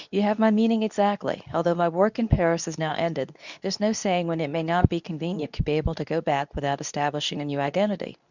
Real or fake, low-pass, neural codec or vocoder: fake; 7.2 kHz; codec, 24 kHz, 0.9 kbps, WavTokenizer, medium speech release version 2